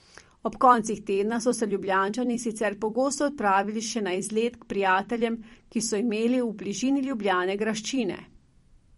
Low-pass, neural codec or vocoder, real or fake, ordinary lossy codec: 19.8 kHz; vocoder, 44.1 kHz, 128 mel bands every 256 samples, BigVGAN v2; fake; MP3, 48 kbps